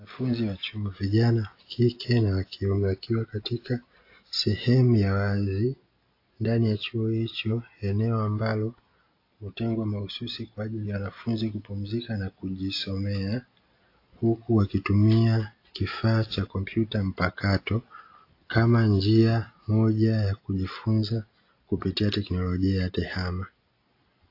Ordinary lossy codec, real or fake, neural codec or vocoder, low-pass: AAC, 32 kbps; real; none; 5.4 kHz